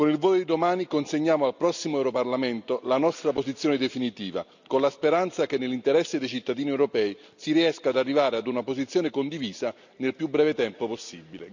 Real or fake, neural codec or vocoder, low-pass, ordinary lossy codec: real; none; 7.2 kHz; none